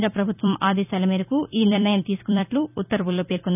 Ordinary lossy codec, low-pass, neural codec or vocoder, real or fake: none; 3.6 kHz; vocoder, 44.1 kHz, 128 mel bands every 256 samples, BigVGAN v2; fake